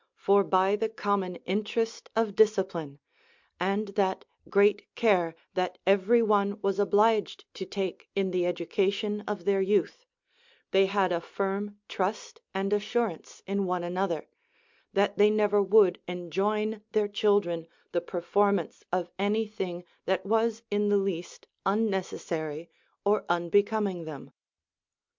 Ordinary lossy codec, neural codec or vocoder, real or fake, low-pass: MP3, 64 kbps; none; real; 7.2 kHz